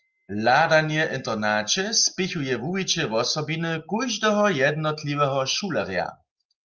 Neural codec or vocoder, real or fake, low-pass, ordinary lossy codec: none; real; 7.2 kHz; Opus, 32 kbps